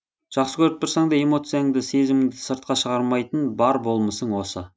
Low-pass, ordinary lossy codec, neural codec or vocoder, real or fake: none; none; none; real